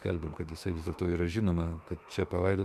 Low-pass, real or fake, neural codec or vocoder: 14.4 kHz; fake; autoencoder, 48 kHz, 32 numbers a frame, DAC-VAE, trained on Japanese speech